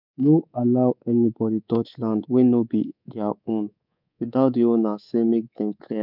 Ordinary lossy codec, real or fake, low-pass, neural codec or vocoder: none; fake; 5.4 kHz; codec, 24 kHz, 3.1 kbps, DualCodec